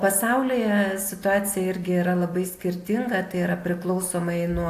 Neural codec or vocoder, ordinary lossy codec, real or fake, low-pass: none; AAC, 64 kbps; real; 14.4 kHz